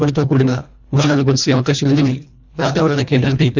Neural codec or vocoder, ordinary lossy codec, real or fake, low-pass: codec, 24 kHz, 1.5 kbps, HILCodec; none; fake; 7.2 kHz